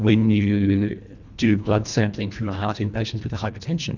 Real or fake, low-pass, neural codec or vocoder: fake; 7.2 kHz; codec, 24 kHz, 1.5 kbps, HILCodec